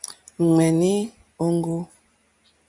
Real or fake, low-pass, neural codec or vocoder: real; 10.8 kHz; none